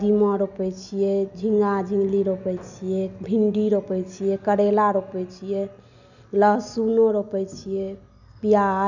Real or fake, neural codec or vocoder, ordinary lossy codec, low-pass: real; none; none; 7.2 kHz